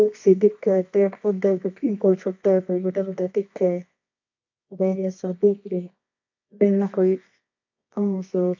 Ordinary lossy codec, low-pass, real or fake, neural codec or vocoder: MP3, 48 kbps; 7.2 kHz; fake; codec, 24 kHz, 0.9 kbps, WavTokenizer, medium music audio release